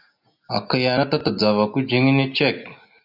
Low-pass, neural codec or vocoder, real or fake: 5.4 kHz; none; real